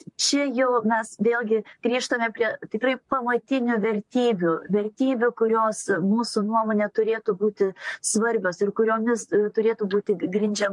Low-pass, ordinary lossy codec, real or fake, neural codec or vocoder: 10.8 kHz; MP3, 64 kbps; fake; vocoder, 44.1 kHz, 128 mel bands, Pupu-Vocoder